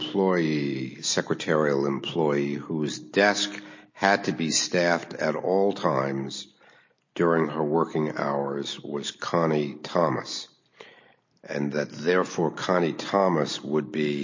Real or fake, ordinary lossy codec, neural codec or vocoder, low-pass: real; MP3, 32 kbps; none; 7.2 kHz